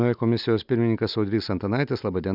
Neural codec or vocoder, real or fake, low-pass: none; real; 5.4 kHz